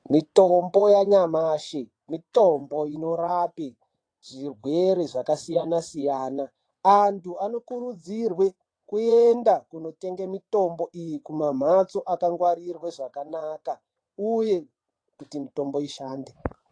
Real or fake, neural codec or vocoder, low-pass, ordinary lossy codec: fake; vocoder, 22.05 kHz, 80 mel bands, WaveNeXt; 9.9 kHz; AAC, 48 kbps